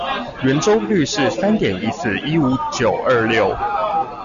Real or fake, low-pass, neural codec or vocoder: real; 7.2 kHz; none